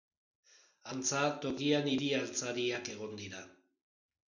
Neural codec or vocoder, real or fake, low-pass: none; real; 7.2 kHz